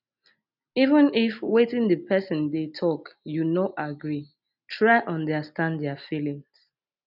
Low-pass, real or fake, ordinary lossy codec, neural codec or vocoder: 5.4 kHz; real; none; none